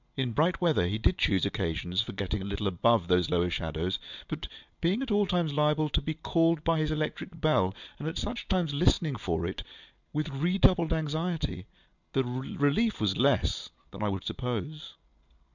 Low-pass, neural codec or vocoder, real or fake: 7.2 kHz; none; real